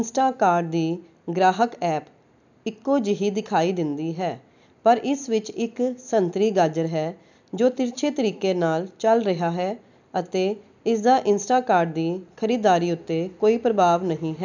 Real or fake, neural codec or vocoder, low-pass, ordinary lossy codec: real; none; 7.2 kHz; none